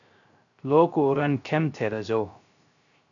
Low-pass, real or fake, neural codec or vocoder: 7.2 kHz; fake; codec, 16 kHz, 0.3 kbps, FocalCodec